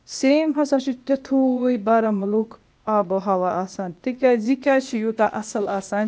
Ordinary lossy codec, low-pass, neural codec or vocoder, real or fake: none; none; codec, 16 kHz, 0.8 kbps, ZipCodec; fake